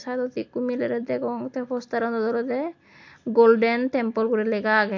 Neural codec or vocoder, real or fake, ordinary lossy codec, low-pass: none; real; AAC, 48 kbps; 7.2 kHz